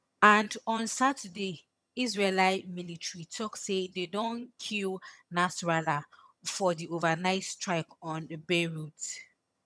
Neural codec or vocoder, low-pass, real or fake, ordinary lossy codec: vocoder, 22.05 kHz, 80 mel bands, HiFi-GAN; none; fake; none